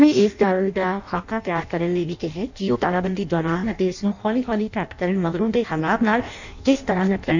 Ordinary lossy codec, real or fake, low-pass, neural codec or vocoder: none; fake; 7.2 kHz; codec, 16 kHz in and 24 kHz out, 0.6 kbps, FireRedTTS-2 codec